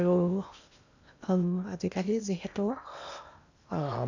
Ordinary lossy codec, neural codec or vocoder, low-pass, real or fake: none; codec, 16 kHz in and 24 kHz out, 0.8 kbps, FocalCodec, streaming, 65536 codes; 7.2 kHz; fake